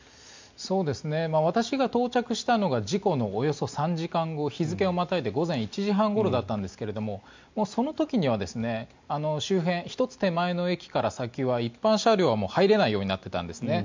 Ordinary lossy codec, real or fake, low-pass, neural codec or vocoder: MP3, 64 kbps; real; 7.2 kHz; none